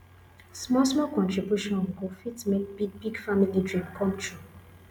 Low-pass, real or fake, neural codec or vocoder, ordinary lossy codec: 19.8 kHz; real; none; none